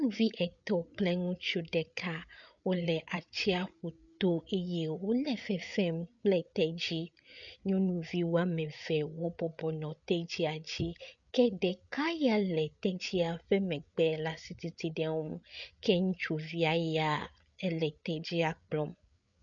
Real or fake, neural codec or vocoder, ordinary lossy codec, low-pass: fake; codec, 16 kHz, 8 kbps, FreqCodec, larger model; MP3, 96 kbps; 7.2 kHz